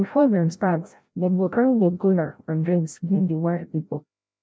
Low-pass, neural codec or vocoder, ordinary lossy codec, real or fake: none; codec, 16 kHz, 0.5 kbps, FreqCodec, larger model; none; fake